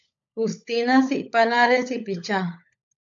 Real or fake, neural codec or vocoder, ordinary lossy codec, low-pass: fake; codec, 16 kHz, 16 kbps, FunCodec, trained on LibriTTS, 50 frames a second; AAC, 64 kbps; 7.2 kHz